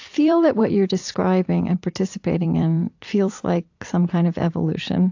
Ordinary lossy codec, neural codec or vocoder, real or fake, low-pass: AAC, 48 kbps; vocoder, 44.1 kHz, 128 mel bands every 256 samples, BigVGAN v2; fake; 7.2 kHz